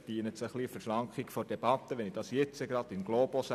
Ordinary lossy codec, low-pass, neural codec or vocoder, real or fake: none; 14.4 kHz; none; real